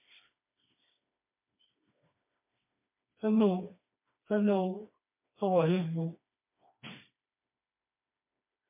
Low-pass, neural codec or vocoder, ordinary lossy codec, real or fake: 3.6 kHz; codec, 16 kHz, 2 kbps, FreqCodec, smaller model; MP3, 32 kbps; fake